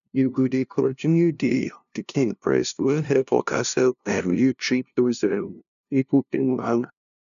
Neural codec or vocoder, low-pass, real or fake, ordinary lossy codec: codec, 16 kHz, 0.5 kbps, FunCodec, trained on LibriTTS, 25 frames a second; 7.2 kHz; fake; none